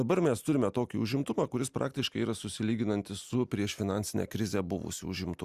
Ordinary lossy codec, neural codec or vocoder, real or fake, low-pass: Opus, 64 kbps; none; real; 14.4 kHz